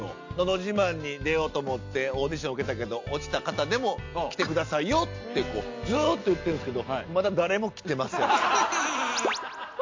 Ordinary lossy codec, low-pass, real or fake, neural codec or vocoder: MP3, 48 kbps; 7.2 kHz; real; none